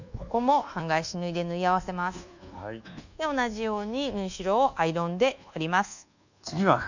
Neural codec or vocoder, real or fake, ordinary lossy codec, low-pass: codec, 24 kHz, 1.2 kbps, DualCodec; fake; none; 7.2 kHz